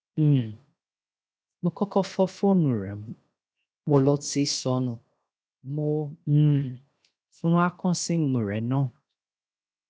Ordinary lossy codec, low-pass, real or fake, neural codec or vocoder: none; none; fake; codec, 16 kHz, 0.7 kbps, FocalCodec